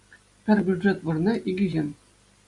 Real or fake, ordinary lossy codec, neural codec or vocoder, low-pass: real; Opus, 64 kbps; none; 10.8 kHz